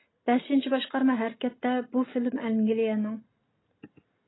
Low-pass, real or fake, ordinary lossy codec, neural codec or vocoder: 7.2 kHz; real; AAC, 16 kbps; none